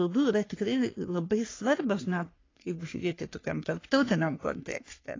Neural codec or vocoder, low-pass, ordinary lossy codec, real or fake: codec, 44.1 kHz, 3.4 kbps, Pupu-Codec; 7.2 kHz; AAC, 32 kbps; fake